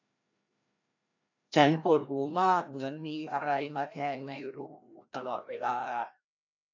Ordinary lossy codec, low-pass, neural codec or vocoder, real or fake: AAC, 48 kbps; 7.2 kHz; codec, 16 kHz, 1 kbps, FreqCodec, larger model; fake